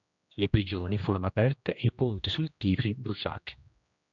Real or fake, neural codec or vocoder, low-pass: fake; codec, 16 kHz, 1 kbps, X-Codec, HuBERT features, trained on general audio; 7.2 kHz